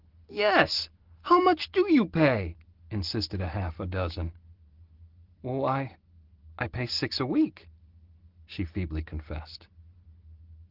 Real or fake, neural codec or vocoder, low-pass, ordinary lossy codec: real; none; 5.4 kHz; Opus, 16 kbps